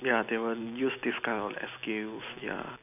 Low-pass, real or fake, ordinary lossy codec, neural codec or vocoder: 3.6 kHz; real; none; none